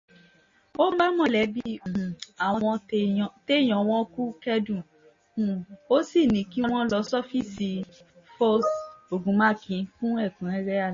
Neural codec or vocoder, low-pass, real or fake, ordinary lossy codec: none; 7.2 kHz; real; MP3, 32 kbps